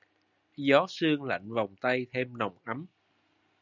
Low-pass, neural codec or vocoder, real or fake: 7.2 kHz; none; real